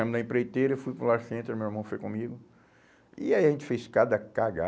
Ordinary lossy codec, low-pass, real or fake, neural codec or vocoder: none; none; real; none